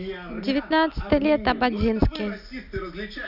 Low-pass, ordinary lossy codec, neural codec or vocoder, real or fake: 5.4 kHz; none; none; real